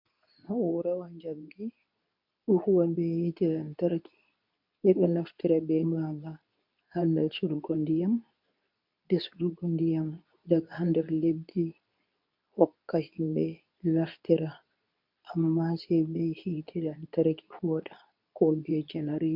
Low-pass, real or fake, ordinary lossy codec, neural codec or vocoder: 5.4 kHz; fake; MP3, 48 kbps; codec, 24 kHz, 0.9 kbps, WavTokenizer, medium speech release version 2